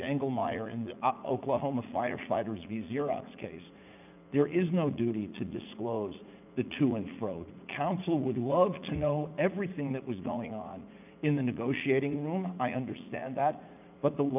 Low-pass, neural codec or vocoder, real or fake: 3.6 kHz; vocoder, 44.1 kHz, 80 mel bands, Vocos; fake